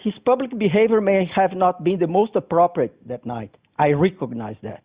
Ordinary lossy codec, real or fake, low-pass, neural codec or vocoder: Opus, 64 kbps; real; 3.6 kHz; none